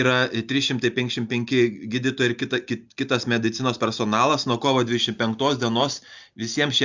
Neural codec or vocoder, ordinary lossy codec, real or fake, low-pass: none; Opus, 64 kbps; real; 7.2 kHz